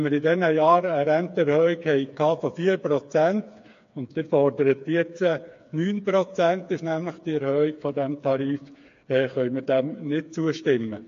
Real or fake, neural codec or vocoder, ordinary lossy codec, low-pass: fake; codec, 16 kHz, 4 kbps, FreqCodec, smaller model; AAC, 48 kbps; 7.2 kHz